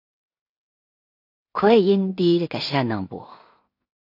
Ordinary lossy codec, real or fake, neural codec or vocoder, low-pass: AAC, 32 kbps; fake; codec, 16 kHz in and 24 kHz out, 0.4 kbps, LongCat-Audio-Codec, two codebook decoder; 5.4 kHz